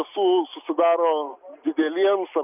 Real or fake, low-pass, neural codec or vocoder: real; 3.6 kHz; none